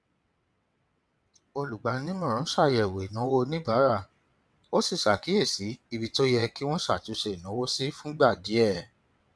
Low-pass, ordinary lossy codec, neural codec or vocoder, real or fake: none; none; vocoder, 22.05 kHz, 80 mel bands, WaveNeXt; fake